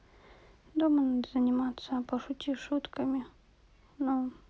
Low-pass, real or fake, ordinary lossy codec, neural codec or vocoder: none; real; none; none